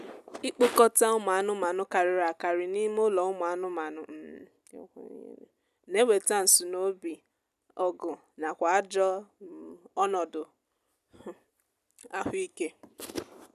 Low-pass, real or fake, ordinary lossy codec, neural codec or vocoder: 14.4 kHz; real; none; none